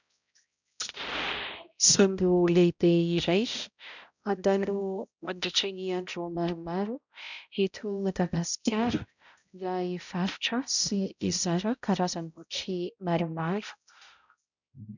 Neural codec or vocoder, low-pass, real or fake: codec, 16 kHz, 0.5 kbps, X-Codec, HuBERT features, trained on balanced general audio; 7.2 kHz; fake